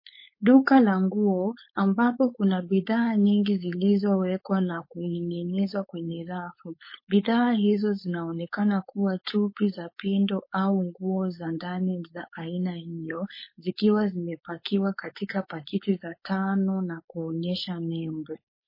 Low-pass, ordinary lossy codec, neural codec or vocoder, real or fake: 5.4 kHz; MP3, 24 kbps; codec, 16 kHz, 4.8 kbps, FACodec; fake